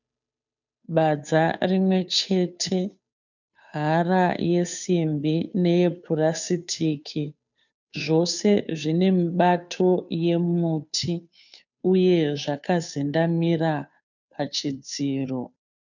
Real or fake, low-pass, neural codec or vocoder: fake; 7.2 kHz; codec, 16 kHz, 2 kbps, FunCodec, trained on Chinese and English, 25 frames a second